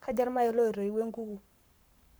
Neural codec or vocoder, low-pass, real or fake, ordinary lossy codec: codec, 44.1 kHz, 7.8 kbps, Pupu-Codec; none; fake; none